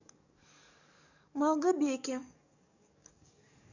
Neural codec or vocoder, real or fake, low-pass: codec, 44.1 kHz, 7.8 kbps, DAC; fake; 7.2 kHz